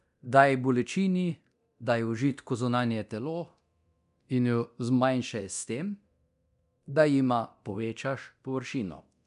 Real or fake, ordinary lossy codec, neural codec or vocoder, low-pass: fake; none; codec, 24 kHz, 0.9 kbps, DualCodec; 10.8 kHz